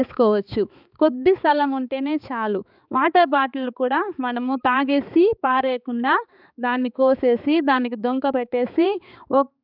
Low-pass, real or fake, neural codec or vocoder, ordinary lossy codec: 5.4 kHz; fake; codec, 16 kHz, 4 kbps, X-Codec, HuBERT features, trained on balanced general audio; none